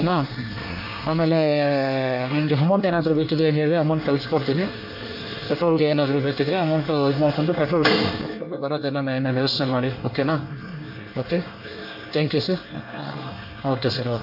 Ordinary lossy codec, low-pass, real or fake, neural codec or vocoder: AAC, 48 kbps; 5.4 kHz; fake; codec, 24 kHz, 1 kbps, SNAC